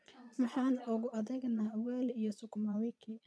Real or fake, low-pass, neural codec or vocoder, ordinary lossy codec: fake; none; vocoder, 22.05 kHz, 80 mel bands, Vocos; none